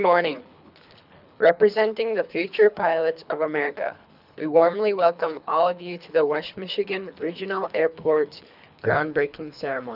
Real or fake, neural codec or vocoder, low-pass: fake; codec, 24 kHz, 3 kbps, HILCodec; 5.4 kHz